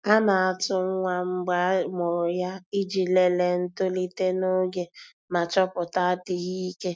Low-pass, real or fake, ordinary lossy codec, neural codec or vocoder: none; real; none; none